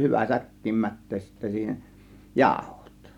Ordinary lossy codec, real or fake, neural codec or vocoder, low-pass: none; real; none; 19.8 kHz